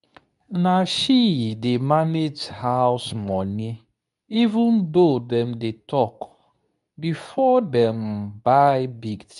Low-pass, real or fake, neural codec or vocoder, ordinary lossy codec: 10.8 kHz; fake; codec, 24 kHz, 0.9 kbps, WavTokenizer, medium speech release version 2; none